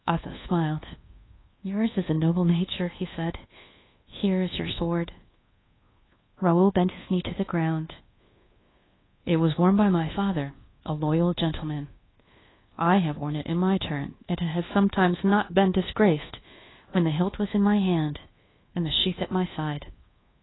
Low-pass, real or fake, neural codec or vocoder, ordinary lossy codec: 7.2 kHz; fake; codec, 24 kHz, 1.2 kbps, DualCodec; AAC, 16 kbps